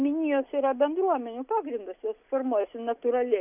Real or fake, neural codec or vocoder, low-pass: real; none; 3.6 kHz